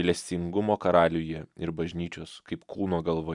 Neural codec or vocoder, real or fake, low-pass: none; real; 10.8 kHz